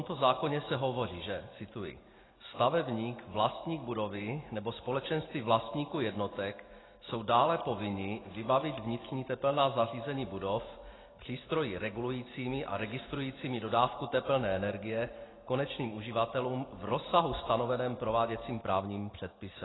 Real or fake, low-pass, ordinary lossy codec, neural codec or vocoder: real; 7.2 kHz; AAC, 16 kbps; none